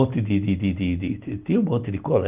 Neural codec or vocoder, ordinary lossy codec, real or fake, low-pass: none; Opus, 32 kbps; real; 3.6 kHz